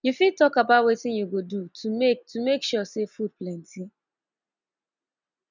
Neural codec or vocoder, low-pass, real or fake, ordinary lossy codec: none; 7.2 kHz; real; none